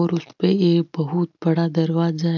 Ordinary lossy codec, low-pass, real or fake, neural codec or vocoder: none; 7.2 kHz; real; none